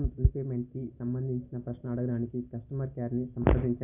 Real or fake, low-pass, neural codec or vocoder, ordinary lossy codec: real; 3.6 kHz; none; none